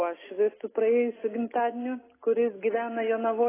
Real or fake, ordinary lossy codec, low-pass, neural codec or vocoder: real; AAC, 16 kbps; 3.6 kHz; none